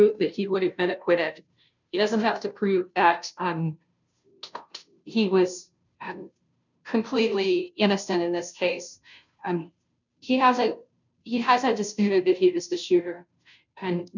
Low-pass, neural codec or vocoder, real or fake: 7.2 kHz; codec, 16 kHz, 0.5 kbps, FunCodec, trained on Chinese and English, 25 frames a second; fake